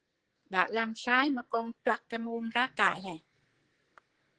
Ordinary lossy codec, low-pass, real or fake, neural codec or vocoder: Opus, 16 kbps; 9.9 kHz; fake; codec, 44.1 kHz, 2.6 kbps, SNAC